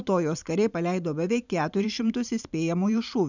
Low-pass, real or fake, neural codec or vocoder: 7.2 kHz; fake; vocoder, 44.1 kHz, 128 mel bands every 512 samples, BigVGAN v2